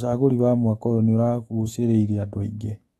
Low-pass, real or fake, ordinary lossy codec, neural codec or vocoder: 19.8 kHz; fake; AAC, 32 kbps; autoencoder, 48 kHz, 128 numbers a frame, DAC-VAE, trained on Japanese speech